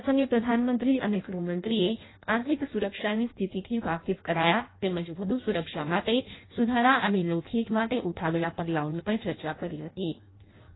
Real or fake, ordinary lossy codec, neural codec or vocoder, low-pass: fake; AAC, 16 kbps; codec, 16 kHz in and 24 kHz out, 0.6 kbps, FireRedTTS-2 codec; 7.2 kHz